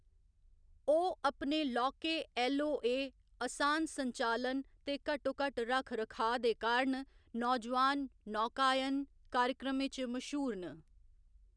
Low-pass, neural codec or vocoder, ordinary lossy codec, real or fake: 9.9 kHz; none; none; real